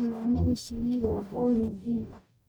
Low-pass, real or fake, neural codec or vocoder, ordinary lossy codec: none; fake; codec, 44.1 kHz, 0.9 kbps, DAC; none